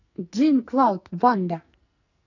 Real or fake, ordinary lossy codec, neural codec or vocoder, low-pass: fake; none; codec, 44.1 kHz, 2.6 kbps, SNAC; 7.2 kHz